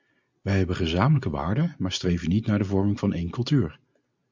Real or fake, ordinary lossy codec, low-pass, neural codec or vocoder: real; MP3, 64 kbps; 7.2 kHz; none